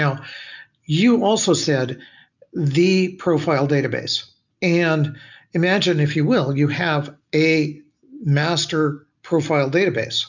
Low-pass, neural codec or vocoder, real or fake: 7.2 kHz; none; real